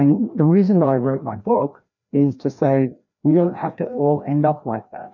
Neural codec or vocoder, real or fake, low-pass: codec, 16 kHz, 1 kbps, FreqCodec, larger model; fake; 7.2 kHz